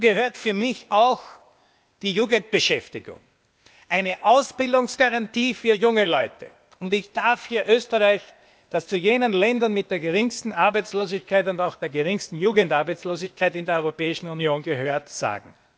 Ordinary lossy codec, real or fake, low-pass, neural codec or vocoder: none; fake; none; codec, 16 kHz, 0.8 kbps, ZipCodec